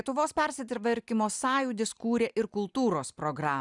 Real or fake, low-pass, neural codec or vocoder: real; 10.8 kHz; none